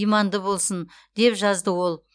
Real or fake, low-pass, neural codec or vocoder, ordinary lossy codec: real; 9.9 kHz; none; none